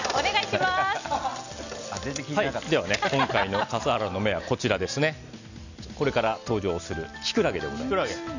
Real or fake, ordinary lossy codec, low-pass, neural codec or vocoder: real; none; 7.2 kHz; none